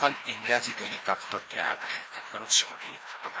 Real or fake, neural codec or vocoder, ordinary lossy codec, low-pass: fake; codec, 16 kHz, 1 kbps, FreqCodec, larger model; none; none